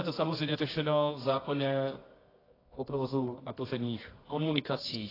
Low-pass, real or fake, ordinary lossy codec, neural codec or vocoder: 5.4 kHz; fake; AAC, 24 kbps; codec, 24 kHz, 0.9 kbps, WavTokenizer, medium music audio release